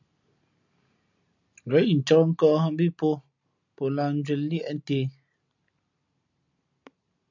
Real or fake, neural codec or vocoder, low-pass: real; none; 7.2 kHz